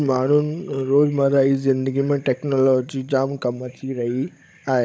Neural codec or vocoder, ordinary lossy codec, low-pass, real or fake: codec, 16 kHz, 16 kbps, FunCodec, trained on Chinese and English, 50 frames a second; none; none; fake